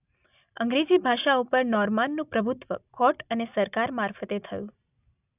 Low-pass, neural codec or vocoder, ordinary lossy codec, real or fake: 3.6 kHz; none; none; real